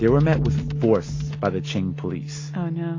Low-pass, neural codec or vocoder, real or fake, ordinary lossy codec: 7.2 kHz; none; real; AAC, 32 kbps